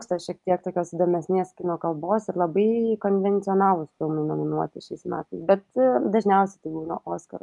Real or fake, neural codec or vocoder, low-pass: real; none; 10.8 kHz